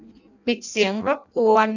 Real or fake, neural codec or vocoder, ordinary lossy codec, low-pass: fake; codec, 16 kHz in and 24 kHz out, 0.6 kbps, FireRedTTS-2 codec; none; 7.2 kHz